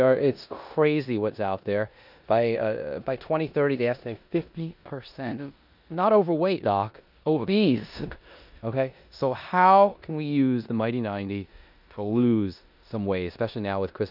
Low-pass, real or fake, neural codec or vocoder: 5.4 kHz; fake; codec, 16 kHz in and 24 kHz out, 0.9 kbps, LongCat-Audio-Codec, four codebook decoder